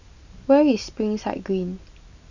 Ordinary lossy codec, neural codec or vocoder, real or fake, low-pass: none; none; real; 7.2 kHz